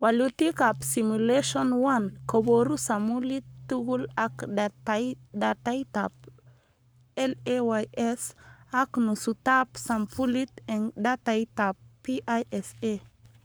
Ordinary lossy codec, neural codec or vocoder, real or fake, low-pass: none; codec, 44.1 kHz, 7.8 kbps, Pupu-Codec; fake; none